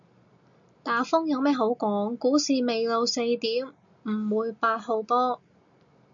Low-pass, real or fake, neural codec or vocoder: 7.2 kHz; real; none